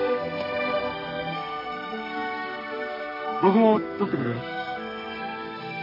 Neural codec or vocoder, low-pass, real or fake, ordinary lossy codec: codec, 32 kHz, 1.9 kbps, SNAC; 5.4 kHz; fake; MP3, 24 kbps